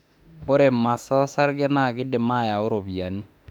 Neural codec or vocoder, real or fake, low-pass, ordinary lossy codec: autoencoder, 48 kHz, 32 numbers a frame, DAC-VAE, trained on Japanese speech; fake; 19.8 kHz; none